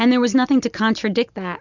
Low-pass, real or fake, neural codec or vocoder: 7.2 kHz; real; none